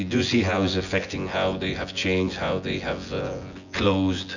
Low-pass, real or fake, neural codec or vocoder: 7.2 kHz; fake; vocoder, 24 kHz, 100 mel bands, Vocos